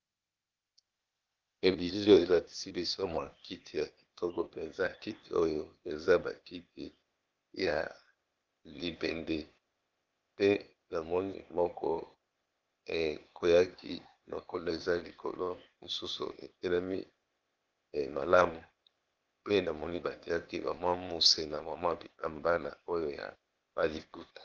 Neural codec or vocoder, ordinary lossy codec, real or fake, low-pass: codec, 16 kHz, 0.8 kbps, ZipCodec; Opus, 24 kbps; fake; 7.2 kHz